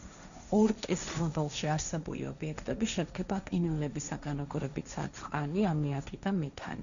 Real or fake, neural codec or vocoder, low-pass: fake; codec, 16 kHz, 1.1 kbps, Voila-Tokenizer; 7.2 kHz